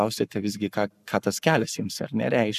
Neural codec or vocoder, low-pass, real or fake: codec, 44.1 kHz, 7.8 kbps, Pupu-Codec; 14.4 kHz; fake